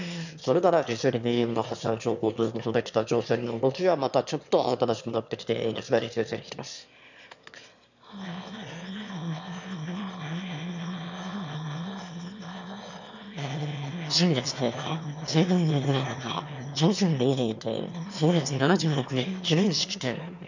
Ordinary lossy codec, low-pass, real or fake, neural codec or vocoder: none; 7.2 kHz; fake; autoencoder, 22.05 kHz, a latent of 192 numbers a frame, VITS, trained on one speaker